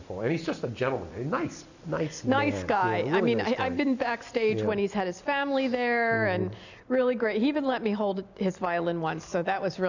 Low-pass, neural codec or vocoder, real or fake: 7.2 kHz; none; real